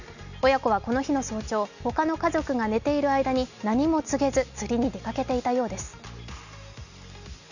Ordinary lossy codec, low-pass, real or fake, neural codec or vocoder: none; 7.2 kHz; real; none